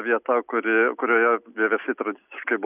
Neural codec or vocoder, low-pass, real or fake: none; 3.6 kHz; real